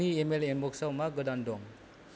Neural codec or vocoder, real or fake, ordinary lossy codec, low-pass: none; real; none; none